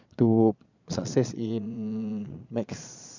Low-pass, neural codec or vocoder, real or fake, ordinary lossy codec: 7.2 kHz; vocoder, 22.05 kHz, 80 mel bands, Vocos; fake; none